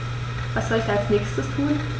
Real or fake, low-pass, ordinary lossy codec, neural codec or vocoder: real; none; none; none